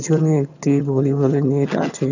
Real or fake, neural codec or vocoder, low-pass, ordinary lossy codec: fake; vocoder, 22.05 kHz, 80 mel bands, HiFi-GAN; 7.2 kHz; none